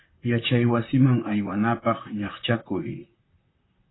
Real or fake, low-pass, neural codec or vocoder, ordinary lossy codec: fake; 7.2 kHz; vocoder, 44.1 kHz, 128 mel bands, Pupu-Vocoder; AAC, 16 kbps